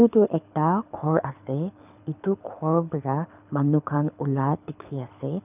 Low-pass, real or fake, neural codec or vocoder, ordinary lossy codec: 3.6 kHz; fake; codec, 24 kHz, 6 kbps, HILCodec; none